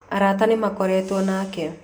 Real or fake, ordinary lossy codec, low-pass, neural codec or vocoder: real; none; none; none